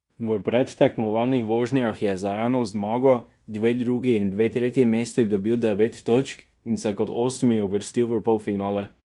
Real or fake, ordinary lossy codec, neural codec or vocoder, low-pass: fake; Opus, 64 kbps; codec, 16 kHz in and 24 kHz out, 0.9 kbps, LongCat-Audio-Codec, fine tuned four codebook decoder; 10.8 kHz